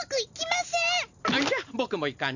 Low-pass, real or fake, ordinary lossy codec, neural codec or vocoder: 7.2 kHz; real; none; none